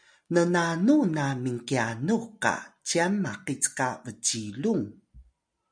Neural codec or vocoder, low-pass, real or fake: none; 9.9 kHz; real